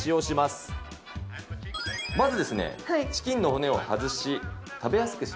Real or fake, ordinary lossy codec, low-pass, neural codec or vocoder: real; none; none; none